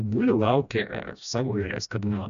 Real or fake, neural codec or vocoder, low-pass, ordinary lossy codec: fake; codec, 16 kHz, 1 kbps, FreqCodec, smaller model; 7.2 kHz; AAC, 96 kbps